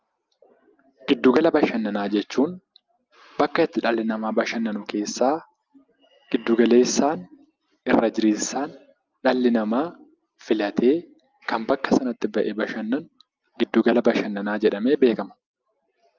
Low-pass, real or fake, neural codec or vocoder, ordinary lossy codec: 7.2 kHz; real; none; Opus, 24 kbps